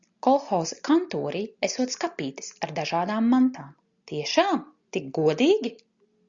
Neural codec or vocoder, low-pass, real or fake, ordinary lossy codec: none; 7.2 kHz; real; Opus, 64 kbps